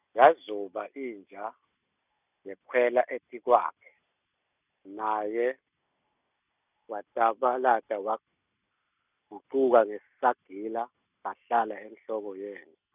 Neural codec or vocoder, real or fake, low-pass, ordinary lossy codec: none; real; 3.6 kHz; none